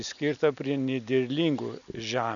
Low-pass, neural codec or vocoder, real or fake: 7.2 kHz; none; real